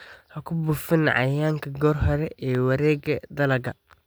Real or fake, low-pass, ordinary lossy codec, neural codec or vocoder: real; none; none; none